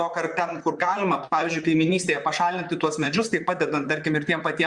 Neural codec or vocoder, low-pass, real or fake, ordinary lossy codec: vocoder, 44.1 kHz, 128 mel bands, Pupu-Vocoder; 10.8 kHz; fake; Opus, 64 kbps